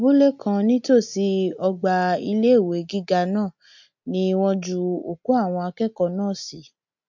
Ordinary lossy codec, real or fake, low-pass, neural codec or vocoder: MP3, 48 kbps; real; 7.2 kHz; none